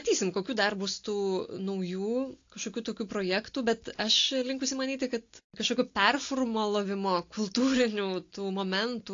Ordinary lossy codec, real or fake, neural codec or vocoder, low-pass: AAC, 48 kbps; real; none; 7.2 kHz